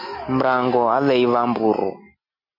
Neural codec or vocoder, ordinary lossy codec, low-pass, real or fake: none; MP3, 32 kbps; 5.4 kHz; real